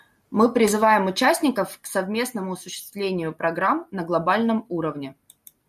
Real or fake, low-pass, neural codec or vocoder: real; 14.4 kHz; none